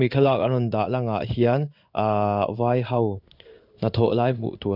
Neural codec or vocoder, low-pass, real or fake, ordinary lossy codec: codec, 16 kHz in and 24 kHz out, 1 kbps, XY-Tokenizer; 5.4 kHz; fake; none